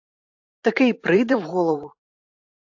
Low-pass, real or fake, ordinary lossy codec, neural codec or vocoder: 7.2 kHz; fake; AAC, 48 kbps; vocoder, 44.1 kHz, 128 mel bands every 512 samples, BigVGAN v2